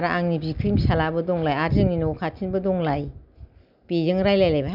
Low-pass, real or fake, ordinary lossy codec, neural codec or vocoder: 5.4 kHz; real; none; none